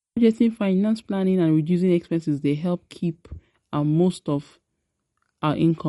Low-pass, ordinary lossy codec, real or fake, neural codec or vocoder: 19.8 kHz; MP3, 48 kbps; real; none